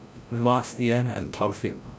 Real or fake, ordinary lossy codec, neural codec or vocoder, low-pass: fake; none; codec, 16 kHz, 0.5 kbps, FreqCodec, larger model; none